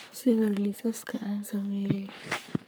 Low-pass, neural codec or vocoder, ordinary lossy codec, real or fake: none; codec, 44.1 kHz, 3.4 kbps, Pupu-Codec; none; fake